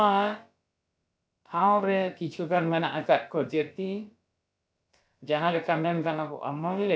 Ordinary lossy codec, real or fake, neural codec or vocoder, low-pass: none; fake; codec, 16 kHz, about 1 kbps, DyCAST, with the encoder's durations; none